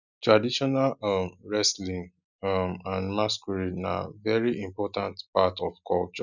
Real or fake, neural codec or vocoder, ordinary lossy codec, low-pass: real; none; none; 7.2 kHz